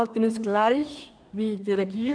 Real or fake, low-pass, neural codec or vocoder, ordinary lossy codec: fake; 9.9 kHz; codec, 24 kHz, 1 kbps, SNAC; none